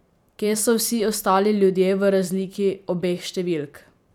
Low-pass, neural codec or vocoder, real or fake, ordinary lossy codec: 19.8 kHz; vocoder, 44.1 kHz, 128 mel bands every 512 samples, BigVGAN v2; fake; none